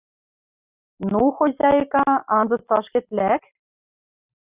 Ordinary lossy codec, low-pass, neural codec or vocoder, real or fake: Opus, 64 kbps; 3.6 kHz; none; real